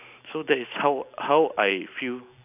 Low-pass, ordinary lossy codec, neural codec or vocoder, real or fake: 3.6 kHz; none; none; real